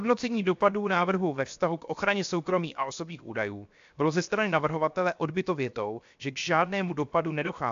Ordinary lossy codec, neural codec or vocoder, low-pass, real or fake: AAC, 64 kbps; codec, 16 kHz, 0.7 kbps, FocalCodec; 7.2 kHz; fake